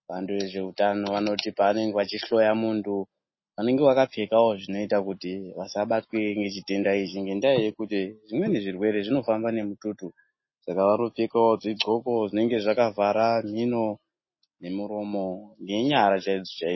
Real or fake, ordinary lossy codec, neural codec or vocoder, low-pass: real; MP3, 24 kbps; none; 7.2 kHz